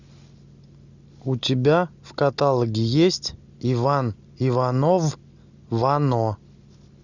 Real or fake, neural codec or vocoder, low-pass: real; none; 7.2 kHz